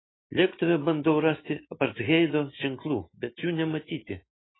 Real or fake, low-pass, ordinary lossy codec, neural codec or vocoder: real; 7.2 kHz; AAC, 16 kbps; none